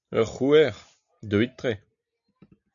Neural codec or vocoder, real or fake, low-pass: none; real; 7.2 kHz